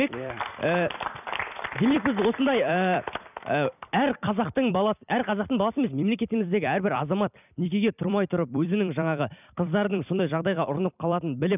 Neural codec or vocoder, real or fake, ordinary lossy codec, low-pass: vocoder, 44.1 kHz, 128 mel bands every 256 samples, BigVGAN v2; fake; none; 3.6 kHz